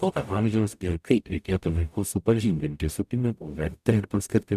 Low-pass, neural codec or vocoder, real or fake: 14.4 kHz; codec, 44.1 kHz, 0.9 kbps, DAC; fake